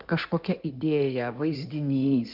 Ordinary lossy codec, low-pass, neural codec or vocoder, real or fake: Opus, 32 kbps; 5.4 kHz; codec, 16 kHz in and 24 kHz out, 2.2 kbps, FireRedTTS-2 codec; fake